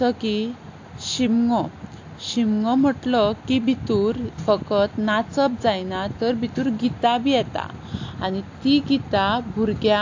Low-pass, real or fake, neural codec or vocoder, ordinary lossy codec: 7.2 kHz; real; none; AAC, 48 kbps